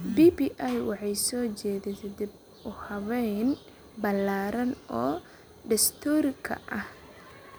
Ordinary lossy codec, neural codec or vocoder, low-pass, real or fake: none; none; none; real